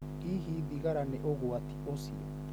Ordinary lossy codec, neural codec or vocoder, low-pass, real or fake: none; none; none; real